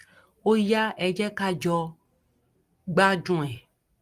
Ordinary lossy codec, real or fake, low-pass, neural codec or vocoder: Opus, 24 kbps; real; 14.4 kHz; none